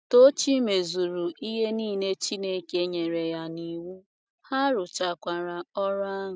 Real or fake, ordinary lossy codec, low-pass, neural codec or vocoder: real; none; none; none